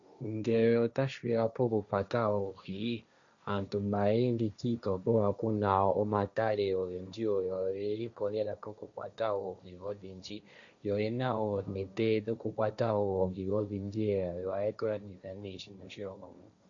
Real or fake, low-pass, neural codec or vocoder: fake; 7.2 kHz; codec, 16 kHz, 1.1 kbps, Voila-Tokenizer